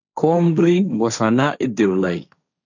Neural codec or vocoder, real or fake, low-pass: codec, 16 kHz, 1.1 kbps, Voila-Tokenizer; fake; 7.2 kHz